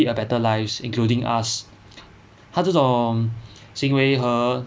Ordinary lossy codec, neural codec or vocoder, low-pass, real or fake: none; none; none; real